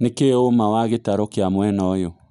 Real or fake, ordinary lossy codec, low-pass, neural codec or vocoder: real; none; 10.8 kHz; none